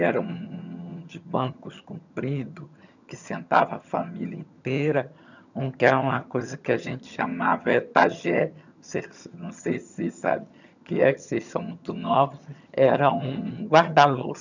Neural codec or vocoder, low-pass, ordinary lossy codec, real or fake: vocoder, 22.05 kHz, 80 mel bands, HiFi-GAN; 7.2 kHz; none; fake